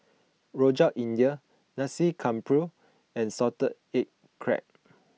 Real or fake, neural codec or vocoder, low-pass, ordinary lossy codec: real; none; none; none